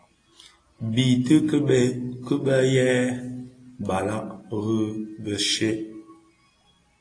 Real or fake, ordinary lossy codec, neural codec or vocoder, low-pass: real; AAC, 32 kbps; none; 9.9 kHz